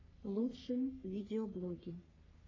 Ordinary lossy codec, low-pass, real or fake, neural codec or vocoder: AAC, 32 kbps; 7.2 kHz; fake; codec, 44.1 kHz, 3.4 kbps, Pupu-Codec